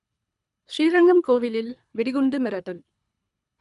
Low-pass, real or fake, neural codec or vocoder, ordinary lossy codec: 10.8 kHz; fake; codec, 24 kHz, 3 kbps, HILCodec; none